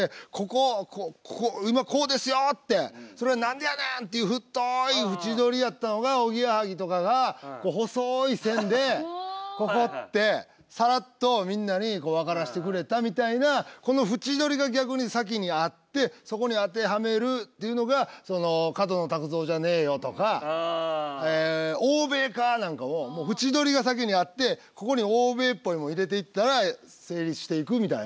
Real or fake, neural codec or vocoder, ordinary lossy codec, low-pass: real; none; none; none